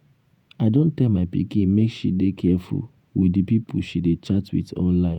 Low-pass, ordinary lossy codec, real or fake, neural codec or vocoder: 19.8 kHz; none; fake; vocoder, 48 kHz, 128 mel bands, Vocos